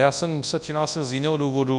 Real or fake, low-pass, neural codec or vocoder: fake; 10.8 kHz; codec, 24 kHz, 0.9 kbps, WavTokenizer, large speech release